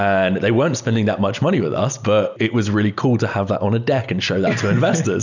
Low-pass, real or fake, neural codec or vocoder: 7.2 kHz; real; none